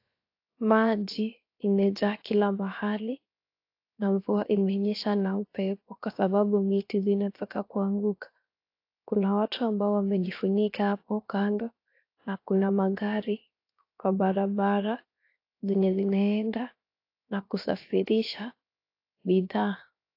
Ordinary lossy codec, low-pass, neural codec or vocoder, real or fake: AAC, 32 kbps; 5.4 kHz; codec, 16 kHz, 0.7 kbps, FocalCodec; fake